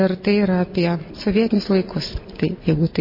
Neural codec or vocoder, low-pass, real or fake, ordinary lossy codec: none; 5.4 kHz; real; MP3, 24 kbps